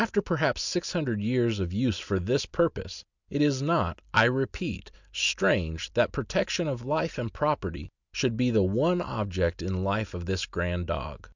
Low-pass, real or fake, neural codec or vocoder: 7.2 kHz; real; none